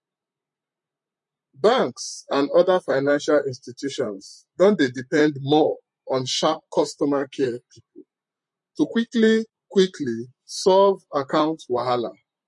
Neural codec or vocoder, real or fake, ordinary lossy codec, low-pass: vocoder, 44.1 kHz, 128 mel bands, Pupu-Vocoder; fake; MP3, 48 kbps; 10.8 kHz